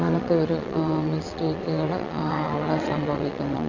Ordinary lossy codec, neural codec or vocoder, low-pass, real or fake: none; vocoder, 22.05 kHz, 80 mel bands, WaveNeXt; 7.2 kHz; fake